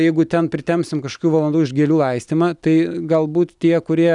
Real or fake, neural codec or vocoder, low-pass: real; none; 10.8 kHz